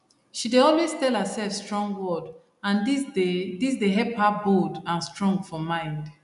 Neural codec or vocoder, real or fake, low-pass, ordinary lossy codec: none; real; 10.8 kHz; none